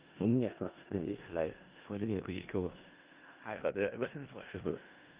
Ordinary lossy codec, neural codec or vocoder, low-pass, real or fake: Opus, 24 kbps; codec, 16 kHz in and 24 kHz out, 0.4 kbps, LongCat-Audio-Codec, four codebook decoder; 3.6 kHz; fake